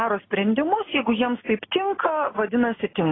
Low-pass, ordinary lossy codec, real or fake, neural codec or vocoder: 7.2 kHz; AAC, 16 kbps; real; none